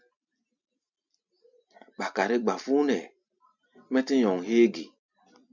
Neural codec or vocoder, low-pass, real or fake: none; 7.2 kHz; real